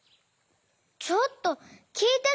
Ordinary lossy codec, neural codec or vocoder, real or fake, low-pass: none; none; real; none